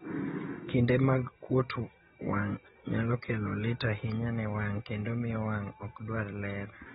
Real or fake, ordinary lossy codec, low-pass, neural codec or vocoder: real; AAC, 16 kbps; 10.8 kHz; none